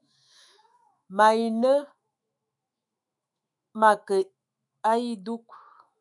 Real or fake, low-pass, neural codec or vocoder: fake; 10.8 kHz; autoencoder, 48 kHz, 128 numbers a frame, DAC-VAE, trained on Japanese speech